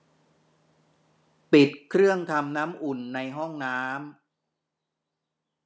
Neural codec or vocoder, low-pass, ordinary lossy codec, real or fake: none; none; none; real